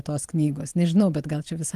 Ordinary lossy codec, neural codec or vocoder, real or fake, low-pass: Opus, 24 kbps; none; real; 14.4 kHz